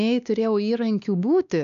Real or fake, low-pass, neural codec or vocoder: fake; 7.2 kHz; codec, 16 kHz, 2 kbps, X-Codec, WavLM features, trained on Multilingual LibriSpeech